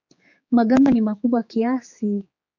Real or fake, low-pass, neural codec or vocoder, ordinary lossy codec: fake; 7.2 kHz; codec, 16 kHz, 4 kbps, X-Codec, HuBERT features, trained on general audio; MP3, 48 kbps